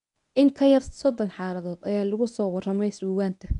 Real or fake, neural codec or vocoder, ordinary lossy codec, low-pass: fake; codec, 24 kHz, 0.9 kbps, WavTokenizer, medium speech release version 1; none; 10.8 kHz